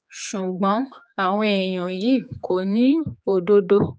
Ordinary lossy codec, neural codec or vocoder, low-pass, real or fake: none; codec, 16 kHz, 4 kbps, X-Codec, HuBERT features, trained on general audio; none; fake